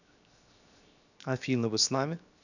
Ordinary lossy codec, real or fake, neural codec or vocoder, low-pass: none; fake; codec, 16 kHz, 0.7 kbps, FocalCodec; 7.2 kHz